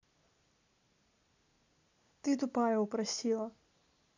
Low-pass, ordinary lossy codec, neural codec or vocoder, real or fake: 7.2 kHz; AAC, 48 kbps; none; real